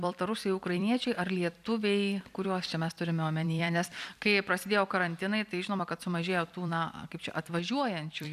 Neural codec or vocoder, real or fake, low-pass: vocoder, 44.1 kHz, 128 mel bands every 256 samples, BigVGAN v2; fake; 14.4 kHz